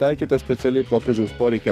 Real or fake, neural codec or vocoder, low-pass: fake; codec, 44.1 kHz, 2.6 kbps, SNAC; 14.4 kHz